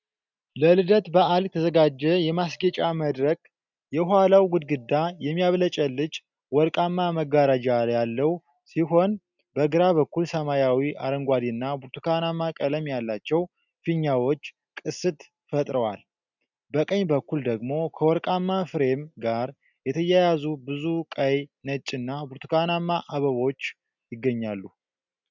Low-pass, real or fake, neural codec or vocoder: 7.2 kHz; real; none